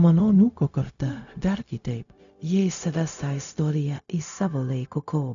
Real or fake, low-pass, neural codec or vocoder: fake; 7.2 kHz; codec, 16 kHz, 0.4 kbps, LongCat-Audio-Codec